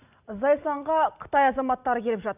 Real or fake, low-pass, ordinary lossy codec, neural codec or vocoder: real; 3.6 kHz; none; none